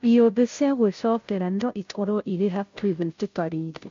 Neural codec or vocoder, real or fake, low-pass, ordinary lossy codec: codec, 16 kHz, 0.5 kbps, FunCodec, trained on Chinese and English, 25 frames a second; fake; 7.2 kHz; MP3, 48 kbps